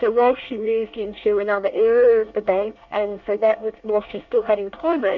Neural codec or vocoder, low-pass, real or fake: codec, 24 kHz, 1 kbps, SNAC; 7.2 kHz; fake